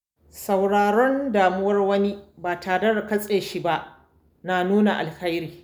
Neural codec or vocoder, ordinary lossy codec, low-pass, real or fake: none; none; 19.8 kHz; real